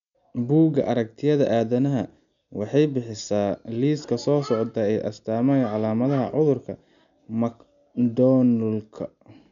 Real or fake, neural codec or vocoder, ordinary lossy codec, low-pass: real; none; none; 7.2 kHz